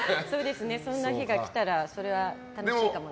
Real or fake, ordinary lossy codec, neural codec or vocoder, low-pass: real; none; none; none